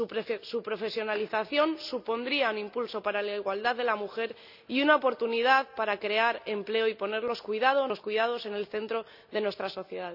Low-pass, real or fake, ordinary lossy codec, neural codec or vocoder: 5.4 kHz; real; none; none